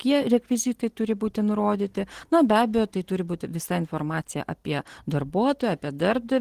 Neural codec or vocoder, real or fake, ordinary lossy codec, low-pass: none; real; Opus, 16 kbps; 14.4 kHz